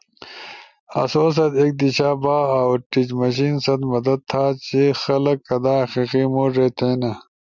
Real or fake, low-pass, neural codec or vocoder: real; 7.2 kHz; none